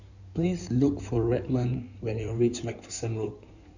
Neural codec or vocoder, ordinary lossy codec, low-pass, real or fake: codec, 16 kHz in and 24 kHz out, 2.2 kbps, FireRedTTS-2 codec; none; 7.2 kHz; fake